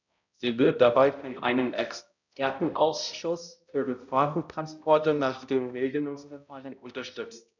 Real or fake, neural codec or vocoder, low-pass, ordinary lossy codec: fake; codec, 16 kHz, 0.5 kbps, X-Codec, HuBERT features, trained on balanced general audio; 7.2 kHz; none